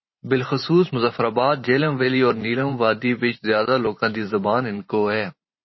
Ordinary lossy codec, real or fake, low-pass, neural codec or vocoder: MP3, 24 kbps; fake; 7.2 kHz; vocoder, 44.1 kHz, 128 mel bands every 512 samples, BigVGAN v2